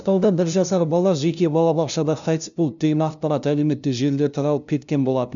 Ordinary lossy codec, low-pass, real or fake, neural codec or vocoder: none; 7.2 kHz; fake; codec, 16 kHz, 0.5 kbps, FunCodec, trained on LibriTTS, 25 frames a second